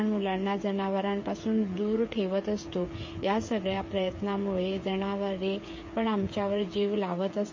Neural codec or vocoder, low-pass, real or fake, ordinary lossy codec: codec, 16 kHz, 8 kbps, FreqCodec, smaller model; 7.2 kHz; fake; MP3, 32 kbps